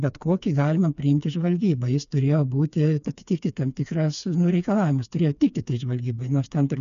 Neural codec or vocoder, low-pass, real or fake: codec, 16 kHz, 4 kbps, FreqCodec, smaller model; 7.2 kHz; fake